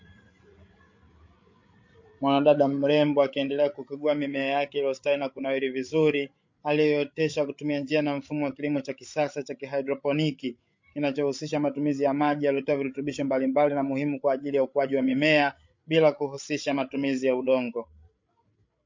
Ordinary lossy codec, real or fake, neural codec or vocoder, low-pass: MP3, 48 kbps; fake; codec, 16 kHz, 8 kbps, FreqCodec, larger model; 7.2 kHz